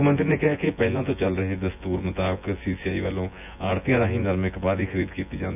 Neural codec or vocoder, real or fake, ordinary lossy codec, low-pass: vocoder, 24 kHz, 100 mel bands, Vocos; fake; none; 3.6 kHz